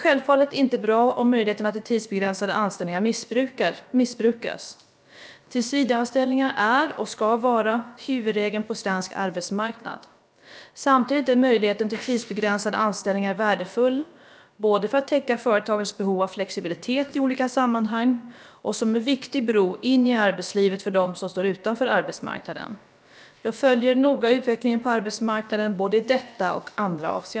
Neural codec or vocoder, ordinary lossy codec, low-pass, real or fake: codec, 16 kHz, about 1 kbps, DyCAST, with the encoder's durations; none; none; fake